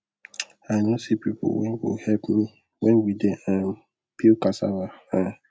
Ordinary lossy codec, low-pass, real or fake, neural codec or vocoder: none; none; real; none